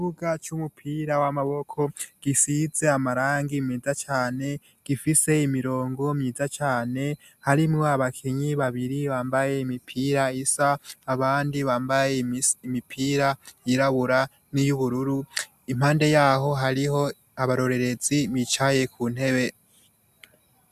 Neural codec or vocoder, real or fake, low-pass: none; real; 14.4 kHz